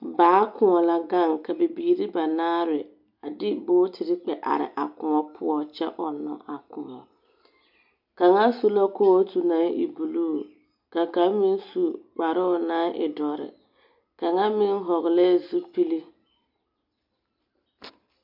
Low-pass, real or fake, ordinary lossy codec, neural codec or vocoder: 5.4 kHz; real; MP3, 48 kbps; none